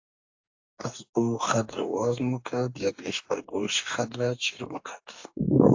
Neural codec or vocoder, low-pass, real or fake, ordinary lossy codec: codec, 44.1 kHz, 2.6 kbps, DAC; 7.2 kHz; fake; AAC, 48 kbps